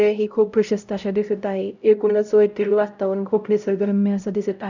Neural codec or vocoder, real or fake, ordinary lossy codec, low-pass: codec, 16 kHz, 0.5 kbps, X-Codec, HuBERT features, trained on LibriSpeech; fake; none; 7.2 kHz